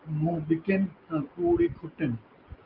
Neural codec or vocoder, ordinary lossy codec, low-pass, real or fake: none; Opus, 24 kbps; 5.4 kHz; real